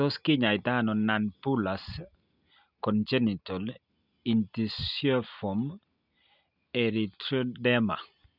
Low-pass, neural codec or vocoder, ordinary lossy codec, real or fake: 5.4 kHz; none; none; real